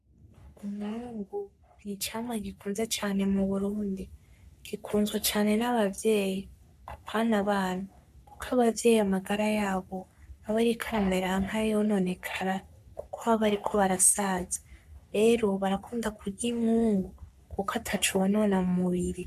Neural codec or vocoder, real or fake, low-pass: codec, 44.1 kHz, 3.4 kbps, Pupu-Codec; fake; 14.4 kHz